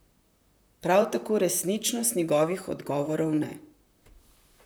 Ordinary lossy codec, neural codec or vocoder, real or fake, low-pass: none; vocoder, 44.1 kHz, 128 mel bands, Pupu-Vocoder; fake; none